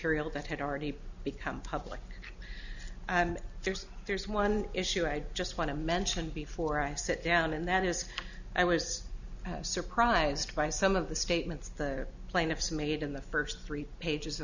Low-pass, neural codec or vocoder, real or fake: 7.2 kHz; none; real